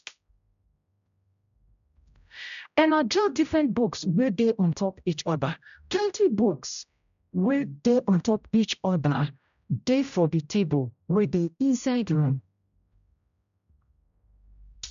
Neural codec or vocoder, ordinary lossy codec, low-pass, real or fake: codec, 16 kHz, 0.5 kbps, X-Codec, HuBERT features, trained on general audio; MP3, 96 kbps; 7.2 kHz; fake